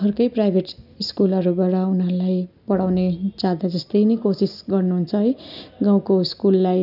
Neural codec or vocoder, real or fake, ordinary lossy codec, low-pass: none; real; none; 5.4 kHz